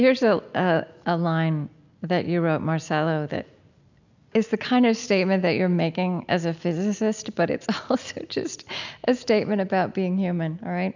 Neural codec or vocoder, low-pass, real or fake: none; 7.2 kHz; real